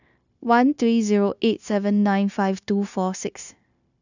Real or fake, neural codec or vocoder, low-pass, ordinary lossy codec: fake; codec, 16 kHz, 0.9 kbps, LongCat-Audio-Codec; 7.2 kHz; none